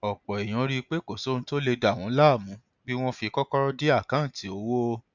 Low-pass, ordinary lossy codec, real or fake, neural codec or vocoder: 7.2 kHz; none; real; none